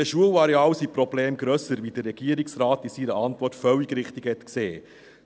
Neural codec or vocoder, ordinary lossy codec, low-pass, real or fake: none; none; none; real